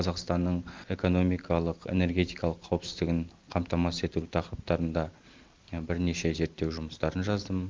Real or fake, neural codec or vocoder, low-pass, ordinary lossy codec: real; none; 7.2 kHz; Opus, 32 kbps